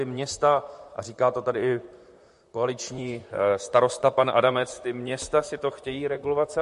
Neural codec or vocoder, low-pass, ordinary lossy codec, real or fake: vocoder, 44.1 kHz, 128 mel bands, Pupu-Vocoder; 14.4 kHz; MP3, 48 kbps; fake